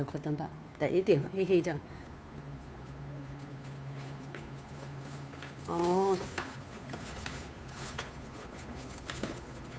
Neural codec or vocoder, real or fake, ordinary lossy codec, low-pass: codec, 16 kHz, 2 kbps, FunCodec, trained on Chinese and English, 25 frames a second; fake; none; none